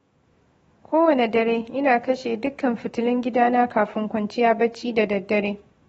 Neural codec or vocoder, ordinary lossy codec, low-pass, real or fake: autoencoder, 48 kHz, 128 numbers a frame, DAC-VAE, trained on Japanese speech; AAC, 24 kbps; 19.8 kHz; fake